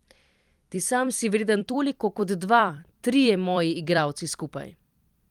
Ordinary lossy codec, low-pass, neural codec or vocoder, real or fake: Opus, 32 kbps; 19.8 kHz; vocoder, 44.1 kHz, 128 mel bands every 256 samples, BigVGAN v2; fake